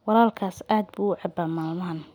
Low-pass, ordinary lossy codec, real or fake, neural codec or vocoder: 19.8 kHz; none; real; none